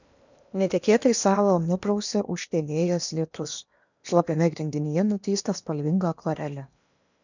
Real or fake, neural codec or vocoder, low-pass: fake; codec, 16 kHz in and 24 kHz out, 0.8 kbps, FocalCodec, streaming, 65536 codes; 7.2 kHz